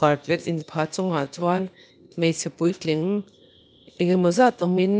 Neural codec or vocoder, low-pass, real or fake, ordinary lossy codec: codec, 16 kHz, 0.8 kbps, ZipCodec; none; fake; none